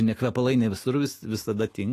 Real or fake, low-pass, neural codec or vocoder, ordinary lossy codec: fake; 14.4 kHz; autoencoder, 48 kHz, 128 numbers a frame, DAC-VAE, trained on Japanese speech; AAC, 48 kbps